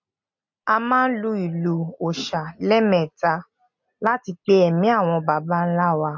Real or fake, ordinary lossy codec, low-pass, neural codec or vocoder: real; MP3, 48 kbps; 7.2 kHz; none